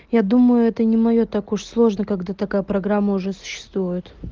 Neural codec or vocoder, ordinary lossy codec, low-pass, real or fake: none; Opus, 16 kbps; 7.2 kHz; real